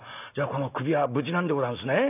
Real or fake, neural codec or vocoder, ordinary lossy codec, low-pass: real; none; none; 3.6 kHz